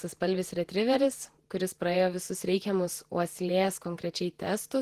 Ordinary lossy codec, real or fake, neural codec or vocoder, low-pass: Opus, 16 kbps; fake; vocoder, 48 kHz, 128 mel bands, Vocos; 14.4 kHz